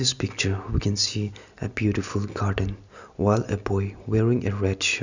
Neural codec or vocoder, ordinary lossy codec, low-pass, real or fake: none; none; 7.2 kHz; real